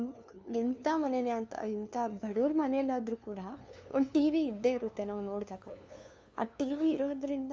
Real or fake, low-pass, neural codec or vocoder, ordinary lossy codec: fake; none; codec, 16 kHz, 2 kbps, FunCodec, trained on LibriTTS, 25 frames a second; none